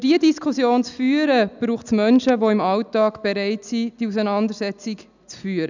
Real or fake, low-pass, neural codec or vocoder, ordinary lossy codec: real; 7.2 kHz; none; none